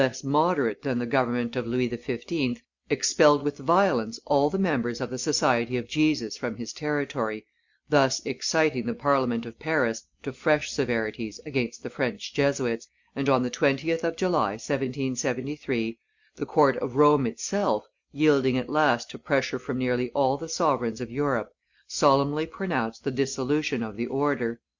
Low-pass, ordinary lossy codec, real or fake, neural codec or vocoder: 7.2 kHz; Opus, 64 kbps; real; none